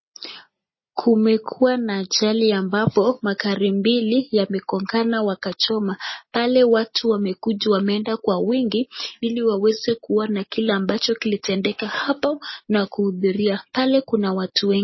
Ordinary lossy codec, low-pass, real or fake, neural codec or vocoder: MP3, 24 kbps; 7.2 kHz; real; none